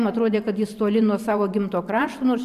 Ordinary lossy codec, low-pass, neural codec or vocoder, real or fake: AAC, 96 kbps; 14.4 kHz; vocoder, 44.1 kHz, 128 mel bands every 512 samples, BigVGAN v2; fake